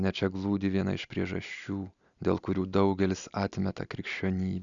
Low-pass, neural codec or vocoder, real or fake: 7.2 kHz; none; real